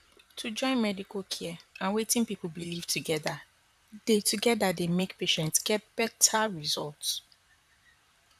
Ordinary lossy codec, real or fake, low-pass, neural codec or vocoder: none; fake; 14.4 kHz; vocoder, 44.1 kHz, 128 mel bands, Pupu-Vocoder